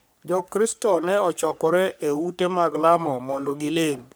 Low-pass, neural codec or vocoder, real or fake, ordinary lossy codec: none; codec, 44.1 kHz, 3.4 kbps, Pupu-Codec; fake; none